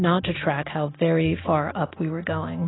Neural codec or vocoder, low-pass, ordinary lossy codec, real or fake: none; 7.2 kHz; AAC, 16 kbps; real